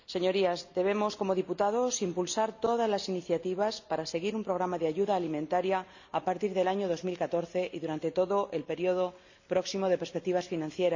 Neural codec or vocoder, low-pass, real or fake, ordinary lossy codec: none; 7.2 kHz; real; none